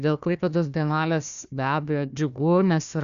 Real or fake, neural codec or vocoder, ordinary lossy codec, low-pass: fake; codec, 16 kHz, 1 kbps, FunCodec, trained on Chinese and English, 50 frames a second; Opus, 64 kbps; 7.2 kHz